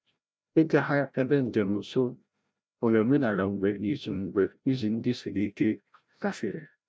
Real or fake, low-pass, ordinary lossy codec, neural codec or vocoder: fake; none; none; codec, 16 kHz, 0.5 kbps, FreqCodec, larger model